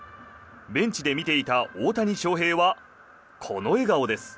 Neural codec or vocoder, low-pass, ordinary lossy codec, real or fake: none; none; none; real